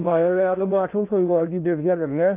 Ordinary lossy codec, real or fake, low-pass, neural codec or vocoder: MP3, 32 kbps; fake; 3.6 kHz; codec, 16 kHz in and 24 kHz out, 0.6 kbps, FocalCodec, streaming, 2048 codes